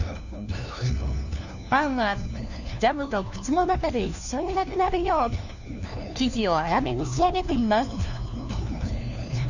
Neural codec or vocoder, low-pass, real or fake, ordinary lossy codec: codec, 16 kHz, 1 kbps, FunCodec, trained on LibriTTS, 50 frames a second; 7.2 kHz; fake; none